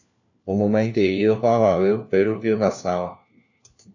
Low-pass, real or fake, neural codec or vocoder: 7.2 kHz; fake; codec, 16 kHz, 1 kbps, FunCodec, trained on LibriTTS, 50 frames a second